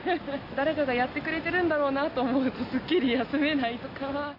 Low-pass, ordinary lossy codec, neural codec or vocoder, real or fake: 5.4 kHz; none; none; real